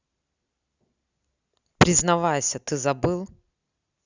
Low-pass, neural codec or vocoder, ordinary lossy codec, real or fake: 7.2 kHz; none; Opus, 64 kbps; real